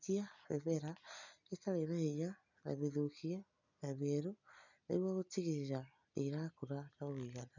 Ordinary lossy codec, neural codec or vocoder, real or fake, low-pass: none; none; real; 7.2 kHz